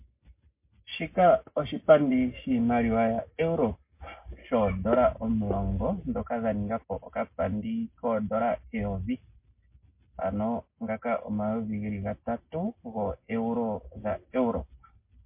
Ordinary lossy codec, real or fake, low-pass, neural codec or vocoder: MP3, 24 kbps; real; 3.6 kHz; none